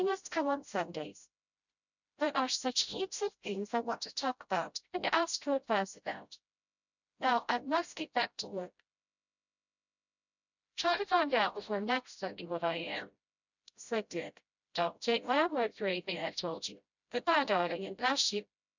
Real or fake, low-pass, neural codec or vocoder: fake; 7.2 kHz; codec, 16 kHz, 0.5 kbps, FreqCodec, smaller model